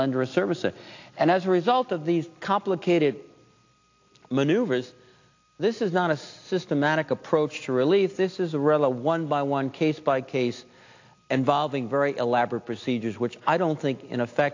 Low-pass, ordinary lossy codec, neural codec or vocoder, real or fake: 7.2 kHz; AAC, 48 kbps; none; real